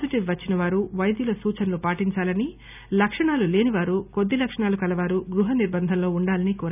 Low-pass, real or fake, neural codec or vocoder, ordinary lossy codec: 3.6 kHz; real; none; none